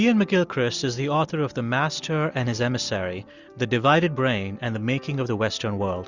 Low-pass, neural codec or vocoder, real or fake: 7.2 kHz; none; real